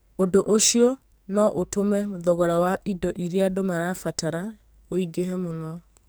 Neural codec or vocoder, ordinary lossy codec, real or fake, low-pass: codec, 44.1 kHz, 2.6 kbps, SNAC; none; fake; none